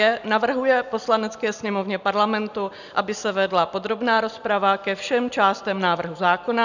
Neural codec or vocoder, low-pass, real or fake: none; 7.2 kHz; real